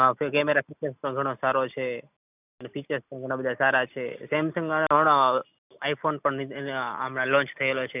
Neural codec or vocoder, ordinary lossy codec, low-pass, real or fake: none; none; 3.6 kHz; real